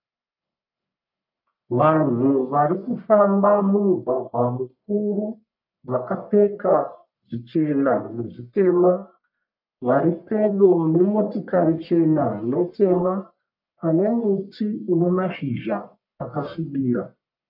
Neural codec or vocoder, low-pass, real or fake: codec, 44.1 kHz, 1.7 kbps, Pupu-Codec; 5.4 kHz; fake